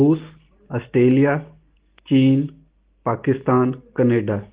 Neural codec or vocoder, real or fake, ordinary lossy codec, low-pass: none; real; Opus, 16 kbps; 3.6 kHz